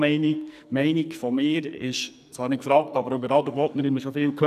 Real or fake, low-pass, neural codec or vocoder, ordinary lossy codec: fake; 14.4 kHz; codec, 44.1 kHz, 2.6 kbps, SNAC; none